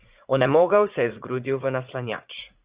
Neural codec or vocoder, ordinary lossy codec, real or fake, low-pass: vocoder, 44.1 kHz, 80 mel bands, Vocos; Opus, 64 kbps; fake; 3.6 kHz